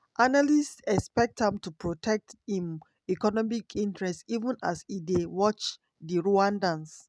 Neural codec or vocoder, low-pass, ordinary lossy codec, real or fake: none; none; none; real